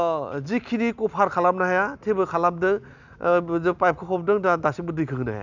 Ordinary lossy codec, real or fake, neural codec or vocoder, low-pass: none; real; none; 7.2 kHz